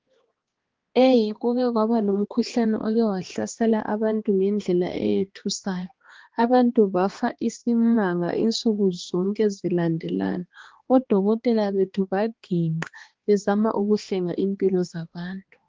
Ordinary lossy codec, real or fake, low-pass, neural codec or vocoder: Opus, 16 kbps; fake; 7.2 kHz; codec, 16 kHz, 2 kbps, X-Codec, HuBERT features, trained on balanced general audio